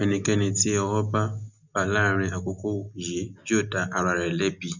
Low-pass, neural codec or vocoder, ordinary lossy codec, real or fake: 7.2 kHz; none; none; real